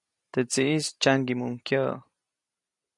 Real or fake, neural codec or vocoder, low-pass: real; none; 10.8 kHz